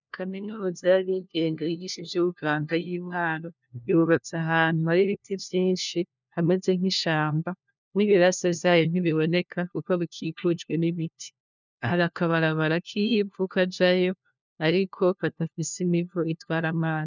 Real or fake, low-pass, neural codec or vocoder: fake; 7.2 kHz; codec, 16 kHz, 1 kbps, FunCodec, trained on LibriTTS, 50 frames a second